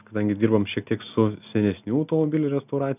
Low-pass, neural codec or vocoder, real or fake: 3.6 kHz; none; real